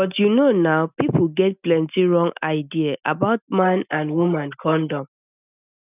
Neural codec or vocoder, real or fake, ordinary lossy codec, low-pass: none; real; none; 3.6 kHz